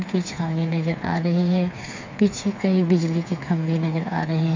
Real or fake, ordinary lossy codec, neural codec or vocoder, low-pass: fake; MP3, 64 kbps; codec, 16 kHz, 4 kbps, FreqCodec, smaller model; 7.2 kHz